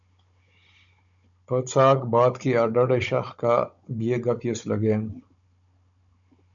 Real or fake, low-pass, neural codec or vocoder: fake; 7.2 kHz; codec, 16 kHz, 16 kbps, FunCodec, trained on Chinese and English, 50 frames a second